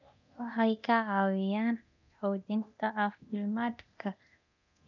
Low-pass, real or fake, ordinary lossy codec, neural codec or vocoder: 7.2 kHz; fake; none; codec, 24 kHz, 0.9 kbps, DualCodec